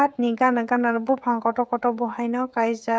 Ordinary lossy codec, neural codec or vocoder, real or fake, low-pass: none; codec, 16 kHz, 16 kbps, FreqCodec, smaller model; fake; none